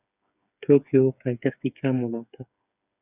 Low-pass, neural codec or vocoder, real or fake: 3.6 kHz; codec, 16 kHz, 8 kbps, FreqCodec, smaller model; fake